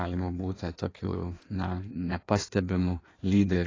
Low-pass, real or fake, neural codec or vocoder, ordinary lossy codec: 7.2 kHz; fake; codec, 16 kHz in and 24 kHz out, 1.1 kbps, FireRedTTS-2 codec; AAC, 32 kbps